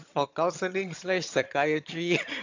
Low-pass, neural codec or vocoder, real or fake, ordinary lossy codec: 7.2 kHz; vocoder, 22.05 kHz, 80 mel bands, HiFi-GAN; fake; AAC, 48 kbps